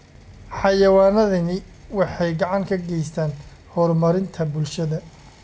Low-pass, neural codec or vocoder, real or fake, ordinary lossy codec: none; none; real; none